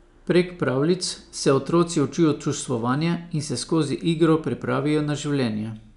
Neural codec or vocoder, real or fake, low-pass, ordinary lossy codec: none; real; 10.8 kHz; none